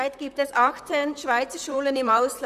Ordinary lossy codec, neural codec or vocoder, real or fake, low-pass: none; vocoder, 44.1 kHz, 128 mel bands, Pupu-Vocoder; fake; 14.4 kHz